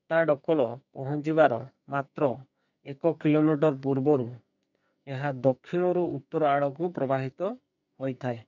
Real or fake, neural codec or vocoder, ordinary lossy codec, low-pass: fake; codec, 44.1 kHz, 2.6 kbps, SNAC; none; 7.2 kHz